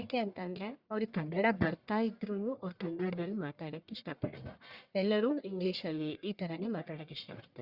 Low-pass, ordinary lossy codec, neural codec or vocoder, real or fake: 5.4 kHz; Opus, 64 kbps; codec, 44.1 kHz, 1.7 kbps, Pupu-Codec; fake